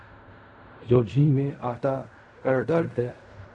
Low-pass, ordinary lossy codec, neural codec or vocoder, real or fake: 10.8 kHz; AAC, 64 kbps; codec, 16 kHz in and 24 kHz out, 0.4 kbps, LongCat-Audio-Codec, fine tuned four codebook decoder; fake